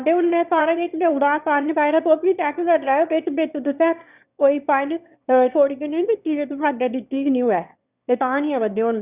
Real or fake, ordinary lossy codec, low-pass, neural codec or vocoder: fake; Opus, 64 kbps; 3.6 kHz; autoencoder, 22.05 kHz, a latent of 192 numbers a frame, VITS, trained on one speaker